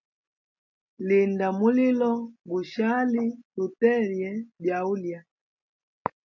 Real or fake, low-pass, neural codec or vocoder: real; 7.2 kHz; none